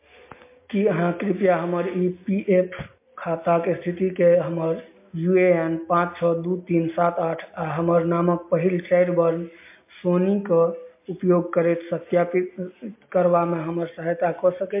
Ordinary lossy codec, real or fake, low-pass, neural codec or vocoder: MP3, 24 kbps; real; 3.6 kHz; none